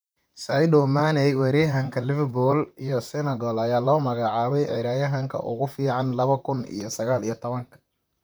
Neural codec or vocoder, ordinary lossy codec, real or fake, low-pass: vocoder, 44.1 kHz, 128 mel bands, Pupu-Vocoder; none; fake; none